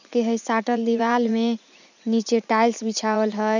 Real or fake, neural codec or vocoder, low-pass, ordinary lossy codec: fake; vocoder, 44.1 kHz, 80 mel bands, Vocos; 7.2 kHz; none